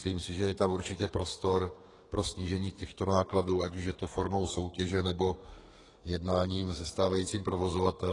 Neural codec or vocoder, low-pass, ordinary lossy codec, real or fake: codec, 32 kHz, 1.9 kbps, SNAC; 10.8 kHz; AAC, 32 kbps; fake